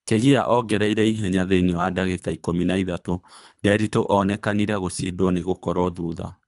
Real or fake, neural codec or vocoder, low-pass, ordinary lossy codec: fake; codec, 24 kHz, 3 kbps, HILCodec; 10.8 kHz; none